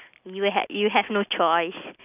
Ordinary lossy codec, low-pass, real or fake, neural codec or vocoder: none; 3.6 kHz; real; none